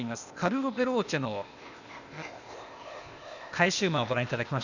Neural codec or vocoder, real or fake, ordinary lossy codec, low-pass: codec, 16 kHz, 0.8 kbps, ZipCodec; fake; none; 7.2 kHz